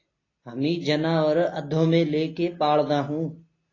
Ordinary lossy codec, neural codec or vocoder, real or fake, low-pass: AAC, 32 kbps; none; real; 7.2 kHz